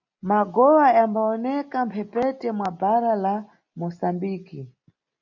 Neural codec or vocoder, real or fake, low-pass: none; real; 7.2 kHz